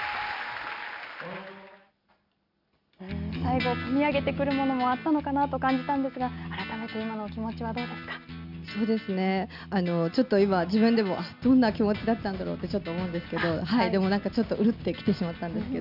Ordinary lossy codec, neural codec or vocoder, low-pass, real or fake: none; none; 5.4 kHz; real